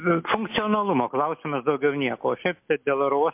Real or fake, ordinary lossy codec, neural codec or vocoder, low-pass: real; MP3, 32 kbps; none; 3.6 kHz